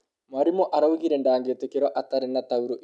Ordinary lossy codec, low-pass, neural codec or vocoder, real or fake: none; none; none; real